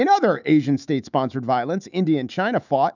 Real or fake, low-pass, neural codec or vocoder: fake; 7.2 kHz; autoencoder, 48 kHz, 128 numbers a frame, DAC-VAE, trained on Japanese speech